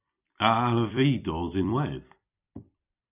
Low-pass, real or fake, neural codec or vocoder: 3.6 kHz; fake; vocoder, 44.1 kHz, 128 mel bands every 256 samples, BigVGAN v2